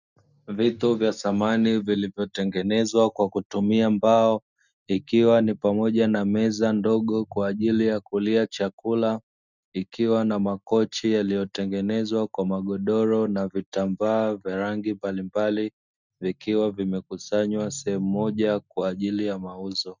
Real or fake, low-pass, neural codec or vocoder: real; 7.2 kHz; none